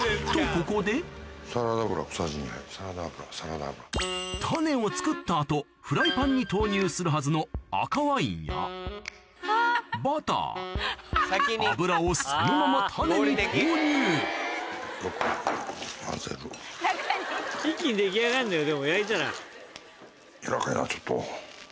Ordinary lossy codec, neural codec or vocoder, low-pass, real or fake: none; none; none; real